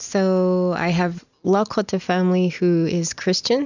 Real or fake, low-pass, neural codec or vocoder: real; 7.2 kHz; none